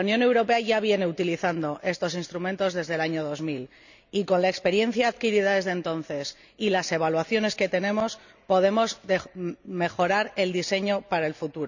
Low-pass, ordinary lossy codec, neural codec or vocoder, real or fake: 7.2 kHz; none; none; real